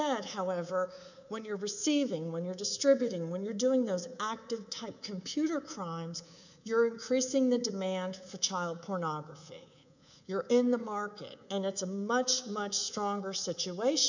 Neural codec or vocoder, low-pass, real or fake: codec, 24 kHz, 3.1 kbps, DualCodec; 7.2 kHz; fake